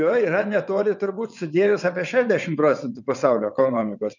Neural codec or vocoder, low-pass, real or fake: vocoder, 22.05 kHz, 80 mel bands, WaveNeXt; 7.2 kHz; fake